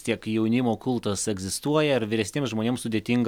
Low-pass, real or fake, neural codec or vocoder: 19.8 kHz; real; none